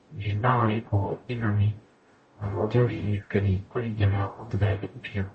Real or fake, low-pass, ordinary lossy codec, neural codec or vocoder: fake; 10.8 kHz; MP3, 32 kbps; codec, 44.1 kHz, 0.9 kbps, DAC